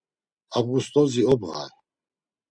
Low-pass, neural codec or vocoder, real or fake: 9.9 kHz; none; real